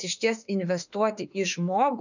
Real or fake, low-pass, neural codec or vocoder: fake; 7.2 kHz; autoencoder, 48 kHz, 32 numbers a frame, DAC-VAE, trained on Japanese speech